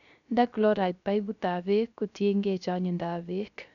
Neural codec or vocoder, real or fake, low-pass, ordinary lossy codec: codec, 16 kHz, 0.3 kbps, FocalCodec; fake; 7.2 kHz; none